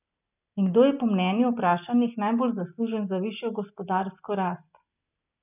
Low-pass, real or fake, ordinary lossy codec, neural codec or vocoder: 3.6 kHz; real; none; none